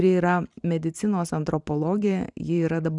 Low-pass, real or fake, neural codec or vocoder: 10.8 kHz; fake; vocoder, 44.1 kHz, 128 mel bands every 512 samples, BigVGAN v2